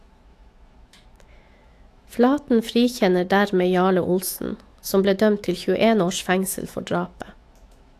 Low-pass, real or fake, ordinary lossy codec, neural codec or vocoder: 14.4 kHz; fake; AAC, 64 kbps; autoencoder, 48 kHz, 128 numbers a frame, DAC-VAE, trained on Japanese speech